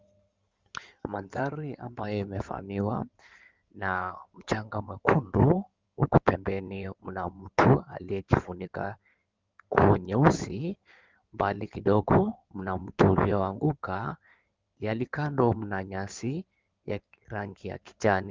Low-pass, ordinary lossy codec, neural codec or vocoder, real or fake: 7.2 kHz; Opus, 32 kbps; codec, 16 kHz in and 24 kHz out, 2.2 kbps, FireRedTTS-2 codec; fake